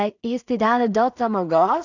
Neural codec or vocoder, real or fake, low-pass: codec, 16 kHz in and 24 kHz out, 0.4 kbps, LongCat-Audio-Codec, two codebook decoder; fake; 7.2 kHz